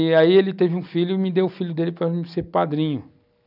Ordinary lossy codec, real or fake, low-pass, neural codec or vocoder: none; real; 5.4 kHz; none